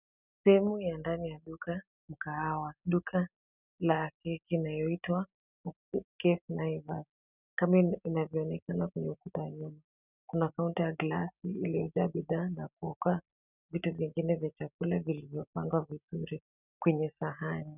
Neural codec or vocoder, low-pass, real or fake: none; 3.6 kHz; real